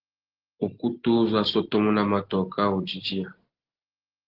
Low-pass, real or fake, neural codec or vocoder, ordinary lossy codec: 5.4 kHz; real; none; Opus, 16 kbps